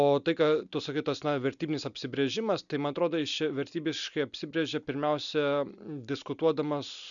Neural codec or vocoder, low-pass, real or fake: none; 7.2 kHz; real